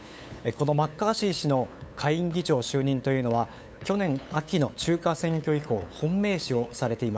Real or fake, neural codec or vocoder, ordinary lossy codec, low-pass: fake; codec, 16 kHz, 8 kbps, FunCodec, trained on LibriTTS, 25 frames a second; none; none